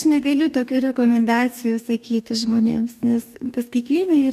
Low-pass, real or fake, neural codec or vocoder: 14.4 kHz; fake; codec, 44.1 kHz, 2.6 kbps, DAC